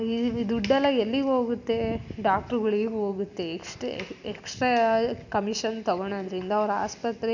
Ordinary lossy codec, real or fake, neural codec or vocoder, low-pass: none; real; none; 7.2 kHz